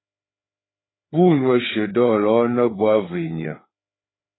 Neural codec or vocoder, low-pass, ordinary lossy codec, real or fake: codec, 16 kHz, 4 kbps, FreqCodec, larger model; 7.2 kHz; AAC, 16 kbps; fake